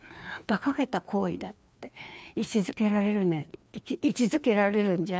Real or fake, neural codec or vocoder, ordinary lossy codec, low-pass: fake; codec, 16 kHz, 2 kbps, FreqCodec, larger model; none; none